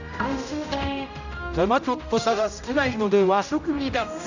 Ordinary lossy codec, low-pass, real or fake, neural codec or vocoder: none; 7.2 kHz; fake; codec, 16 kHz, 0.5 kbps, X-Codec, HuBERT features, trained on general audio